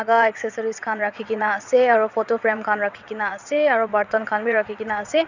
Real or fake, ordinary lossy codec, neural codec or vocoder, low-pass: fake; none; vocoder, 22.05 kHz, 80 mel bands, WaveNeXt; 7.2 kHz